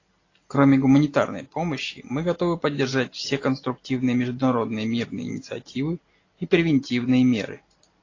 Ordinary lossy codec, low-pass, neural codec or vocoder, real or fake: AAC, 32 kbps; 7.2 kHz; none; real